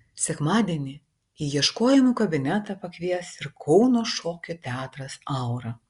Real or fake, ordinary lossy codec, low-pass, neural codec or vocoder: real; Opus, 64 kbps; 10.8 kHz; none